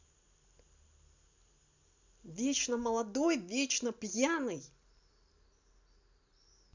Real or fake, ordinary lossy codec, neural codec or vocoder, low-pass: real; none; none; 7.2 kHz